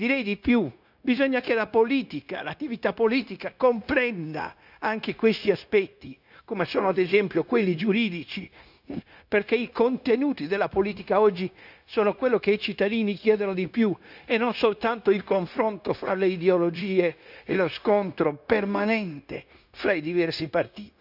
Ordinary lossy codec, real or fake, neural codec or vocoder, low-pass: none; fake; codec, 16 kHz, 0.9 kbps, LongCat-Audio-Codec; 5.4 kHz